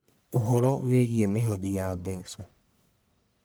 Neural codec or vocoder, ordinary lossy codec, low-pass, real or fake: codec, 44.1 kHz, 1.7 kbps, Pupu-Codec; none; none; fake